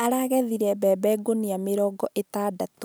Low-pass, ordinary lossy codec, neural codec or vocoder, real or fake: none; none; none; real